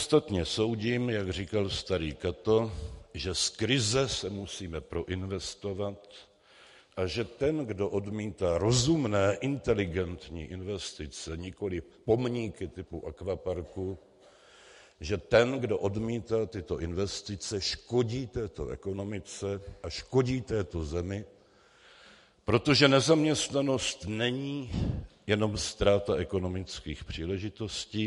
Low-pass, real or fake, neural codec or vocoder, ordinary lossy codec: 14.4 kHz; real; none; MP3, 48 kbps